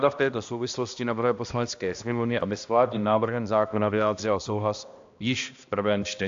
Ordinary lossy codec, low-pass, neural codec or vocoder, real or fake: AAC, 64 kbps; 7.2 kHz; codec, 16 kHz, 1 kbps, X-Codec, HuBERT features, trained on balanced general audio; fake